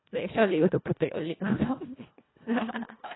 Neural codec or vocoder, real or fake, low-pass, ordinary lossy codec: codec, 24 kHz, 1.5 kbps, HILCodec; fake; 7.2 kHz; AAC, 16 kbps